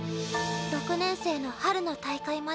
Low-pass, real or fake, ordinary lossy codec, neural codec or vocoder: none; real; none; none